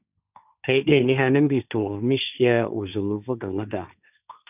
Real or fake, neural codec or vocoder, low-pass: fake; codec, 16 kHz, 1.1 kbps, Voila-Tokenizer; 3.6 kHz